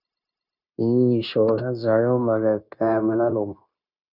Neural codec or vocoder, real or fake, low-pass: codec, 16 kHz, 0.9 kbps, LongCat-Audio-Codec; fake; 5.4 kHz